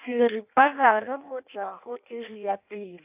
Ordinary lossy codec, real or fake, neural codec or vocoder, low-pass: AAC, 32 kbps; fake; codec, 16 kHz in and 24 kHz out, 0.6 kbps, FireRedTTS-2 codec; 3.6 kHz